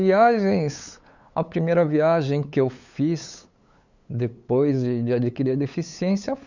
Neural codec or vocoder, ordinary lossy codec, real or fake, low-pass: codec, 16 kHz, 8 kbps, FunCodec, trained on LibriTTS, 25 frames a second; Opus, 64 kbps; fake; 7.2 kHz